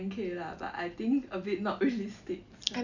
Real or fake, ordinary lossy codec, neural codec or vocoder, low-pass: real; none; none; 7.2 kHz